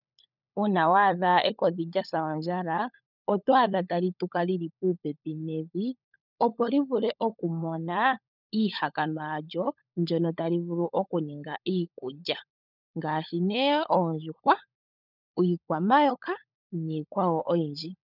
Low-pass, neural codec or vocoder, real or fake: 5.4 kHz; codec, 16 kHz, 16 kbps, FunCodec, trained on LibriTTS, 50 frames a second; fake